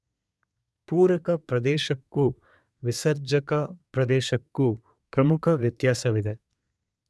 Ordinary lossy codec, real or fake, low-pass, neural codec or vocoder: none; fake; none; codec, 24 kHz, 1 kbps, SNAC